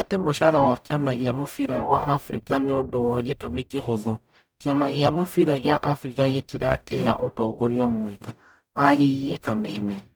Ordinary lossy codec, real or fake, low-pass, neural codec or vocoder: none; fake; none; codec, 44.1 kHz, 0.9 kbps, DAC